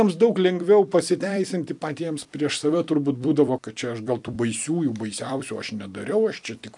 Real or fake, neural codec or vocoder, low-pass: fake; vocoder, 44.1 kHz, 128 mel bands every 256 samples, BigVGAN v2; 10.8 kHz